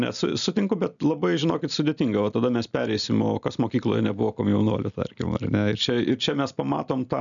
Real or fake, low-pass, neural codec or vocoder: real; 7.2 kHz; none